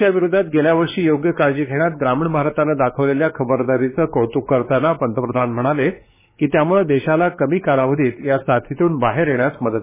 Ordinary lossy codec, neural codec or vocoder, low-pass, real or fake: MP3, 16 kbps; codec, 16 kHz, 4 kbps, X-Codec, WavLM features, trained on Multilingual LibriSpeech; 3.6 kHz; fake